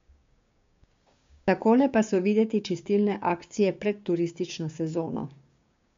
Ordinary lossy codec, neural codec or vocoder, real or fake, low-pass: MP3, 48 kbps; codec, 16 kHz, 6 kbps, DAC; fake; 7.2 kHz